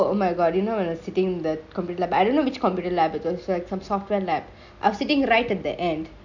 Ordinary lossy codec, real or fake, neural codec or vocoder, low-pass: none; real; none; 7.2 kHz